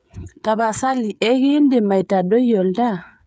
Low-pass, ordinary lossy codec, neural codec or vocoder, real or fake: none; none; codec, 16 kHz, 8 kbps, FreqCodec, smaller model; fake